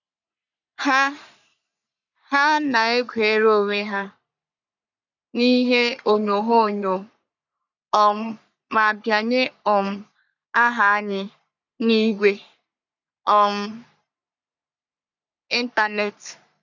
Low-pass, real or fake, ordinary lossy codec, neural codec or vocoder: 7.2 kHz; fake; none; codec, 44.1 kHz, 3.4 kbps, Pupu-Codec